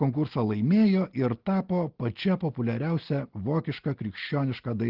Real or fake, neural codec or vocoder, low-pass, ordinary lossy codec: real; none; 5.4 kHz; Opus, 16 kbps